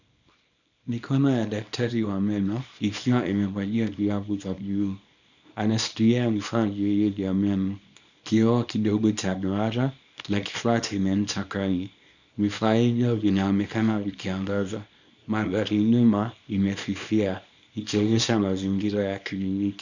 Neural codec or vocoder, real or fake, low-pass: codec, 24 kHz, 0.9 kbps, WavTokenizer, small release; fake; 7.2 kHz